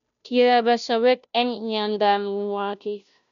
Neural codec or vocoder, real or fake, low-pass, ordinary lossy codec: codec, 16 kHz, 0.5 kbps, FunCodec, trained on Chinese and English, 25 frames a second; fake; 7.2 kHz; none